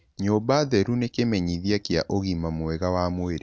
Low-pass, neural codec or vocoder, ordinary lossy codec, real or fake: none; none; none; real